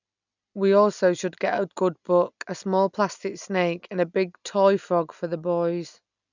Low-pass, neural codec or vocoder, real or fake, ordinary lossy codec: 7.2 kHz; none; real; none